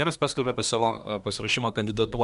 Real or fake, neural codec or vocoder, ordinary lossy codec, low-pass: fake; codec, 24 kHz, 1 kbps, SNAC; MP3, 96 kbps; 10.8 kHz